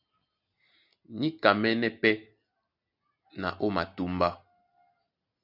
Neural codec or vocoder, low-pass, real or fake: none; 5.4 kHz; real